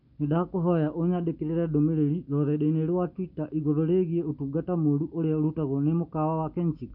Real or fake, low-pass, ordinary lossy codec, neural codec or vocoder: real; 5.4 kHz; none; none